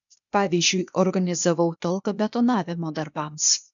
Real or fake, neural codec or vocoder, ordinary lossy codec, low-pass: fake; codec, 16 kHz, 0.8 kbps, ZipCodec; MP3, 96 kbps; 7.2 kHz